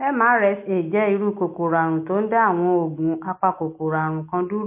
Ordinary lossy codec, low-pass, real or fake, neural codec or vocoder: MP3, 24 kbps; 3.6 kHz; real; none